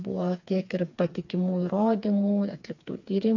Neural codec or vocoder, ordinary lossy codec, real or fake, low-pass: codec, 16 kHz, 4 kbps, FreqCodec, smaller model; AAC, 48 kbps; fake; 7.2 kHz